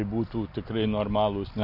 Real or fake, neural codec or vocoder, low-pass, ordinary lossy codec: real; none; 5.4 kHz; MP3, 32 kbps